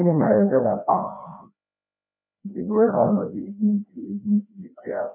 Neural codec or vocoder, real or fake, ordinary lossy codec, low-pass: codec, 16 kHz, 1 kbps, FreqCodec, larger model; fake; none; 3.6 kHz